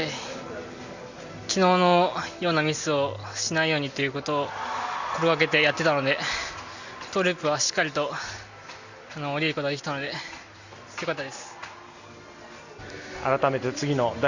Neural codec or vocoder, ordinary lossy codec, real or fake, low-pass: none; Opus, 64 kbps; real; 7.2 kHz